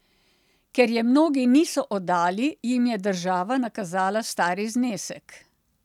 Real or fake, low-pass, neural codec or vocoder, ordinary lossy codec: real; 19.8 kHz; none; none